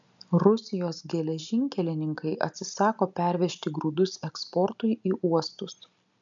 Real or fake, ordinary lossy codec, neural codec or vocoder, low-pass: real; MP3, 64 kbps; none; 7.2 kHz